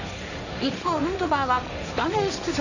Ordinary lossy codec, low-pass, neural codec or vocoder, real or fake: none; 7.2 kHz; codec, 16 kHz, 1.1 kbps, Voila-Tokenizer; fake